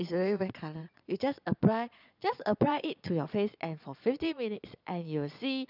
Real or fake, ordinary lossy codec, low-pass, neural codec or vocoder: fake; none; 5.4 kHz; vocoder, 22.05 kHz, 80 mel bands, Vocos